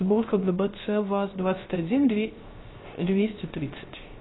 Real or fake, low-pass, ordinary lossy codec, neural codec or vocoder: fake; 7.2 kHz; AAC, 16 kbps; codec, 16 kHz, 0.3 kbps, FocalCodec